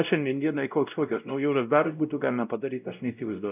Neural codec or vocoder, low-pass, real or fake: codec, 16 kHz, 0.5 kbps, X-Codec, WavLM features, trained on Multilingual LibriSpeech; 3.6 kHz; fake